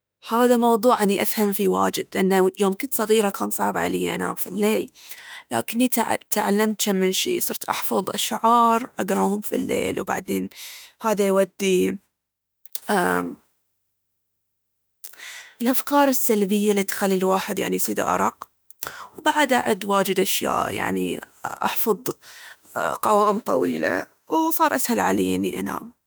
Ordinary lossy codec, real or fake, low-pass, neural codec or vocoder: none; fake; none; autoencoder, 48 kHz, 32 numbers a frame, DAC-VAE, trained on Japanese speech